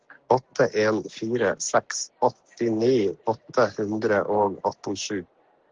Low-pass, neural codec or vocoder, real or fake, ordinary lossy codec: 7.2 kHz; none; real; Opus, 16 kbps